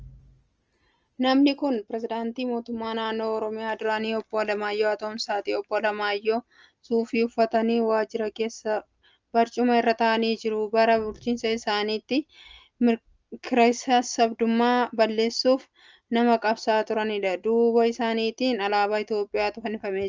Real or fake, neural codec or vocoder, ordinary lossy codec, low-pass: real; none; Opus, 24 kbps; 7.2 kHz